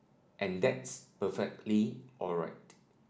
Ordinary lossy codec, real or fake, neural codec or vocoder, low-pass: none; real; none; none